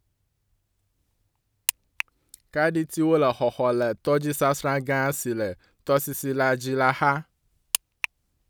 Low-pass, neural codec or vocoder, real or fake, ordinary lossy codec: none; none; real; none